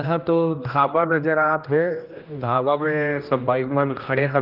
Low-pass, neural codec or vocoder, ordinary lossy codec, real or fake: 5.4 kHz; codec, 16 kHz, 1 kbps, X-Codec, HuBERT features, trained on general audio; Opus, 32 kbps; fake